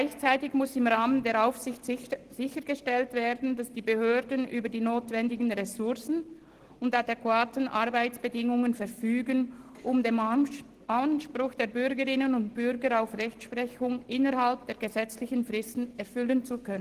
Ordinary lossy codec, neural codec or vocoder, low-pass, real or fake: Opus, 16 kbps; none; 14.4 kHz; real